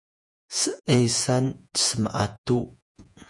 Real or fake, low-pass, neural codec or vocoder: fake; 10.8 kHz; vocoder, 48 kHz, 128 mel bands, Vocos